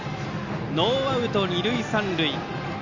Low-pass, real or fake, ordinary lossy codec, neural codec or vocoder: 7.2 kHz; real; AAC, 48 kbps; none